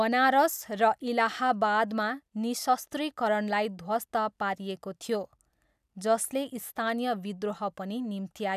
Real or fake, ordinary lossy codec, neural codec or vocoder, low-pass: real; none; none; 14.4 kHz